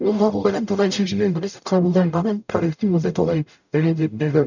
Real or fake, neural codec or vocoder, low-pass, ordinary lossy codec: fake; codec, 44.1 kHz, 0.9 kbps, DAC; 7.2 kHz; none